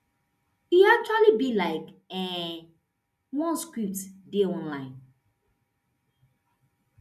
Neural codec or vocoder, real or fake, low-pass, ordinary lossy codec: none; real; 14.4 kHz; none